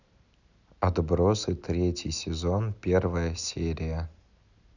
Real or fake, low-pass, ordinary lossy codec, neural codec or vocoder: real; 7.2 kHz; none; none